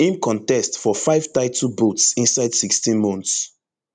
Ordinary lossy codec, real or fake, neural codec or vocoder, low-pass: none; real; none; 9.9 kHz